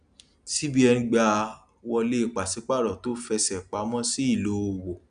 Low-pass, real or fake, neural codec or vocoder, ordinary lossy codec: 9.9 kHz; real; none; none